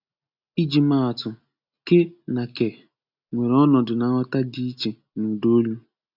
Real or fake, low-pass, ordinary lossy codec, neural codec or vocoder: real; 5.4 kHz; none; none